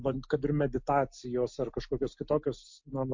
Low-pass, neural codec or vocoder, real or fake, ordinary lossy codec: 7.2 kHz; none; real; MP3, 48 kbps